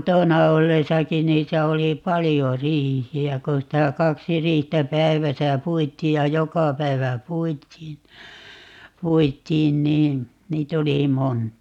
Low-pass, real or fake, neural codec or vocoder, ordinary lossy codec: 19.8 kHz; real; none; none